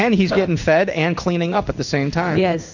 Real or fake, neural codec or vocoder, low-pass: fake; codec, 16 kHz in and 24 kHz out, 1 kbps, XY-Tokenizer; 7.2 kHz